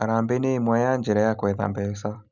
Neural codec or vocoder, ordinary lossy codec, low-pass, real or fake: none; none; 7.2 kHz; real